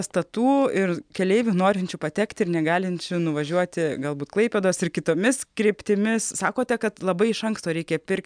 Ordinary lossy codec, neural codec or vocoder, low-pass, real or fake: MP3, 96 kbps; none; 9.9 kHz; real